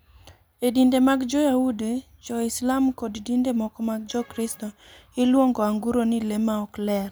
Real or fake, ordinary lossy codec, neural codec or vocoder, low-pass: real; none; none; none